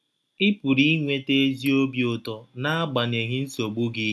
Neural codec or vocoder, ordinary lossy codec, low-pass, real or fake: none; none; none; real